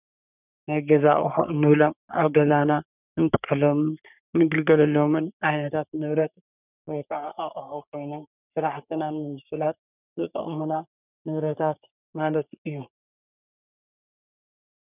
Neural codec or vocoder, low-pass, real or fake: codec, 44.1 kHz, 3.4 kbps, Pupu-Codec; 3.6 kHz; fake